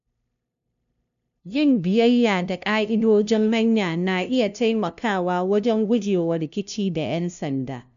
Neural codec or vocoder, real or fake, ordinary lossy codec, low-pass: codec, 16 kHz, 0.5 kbps, FunCodec, trained on LibriTTS, 25 frames a second; fake; none; 7.2 kHz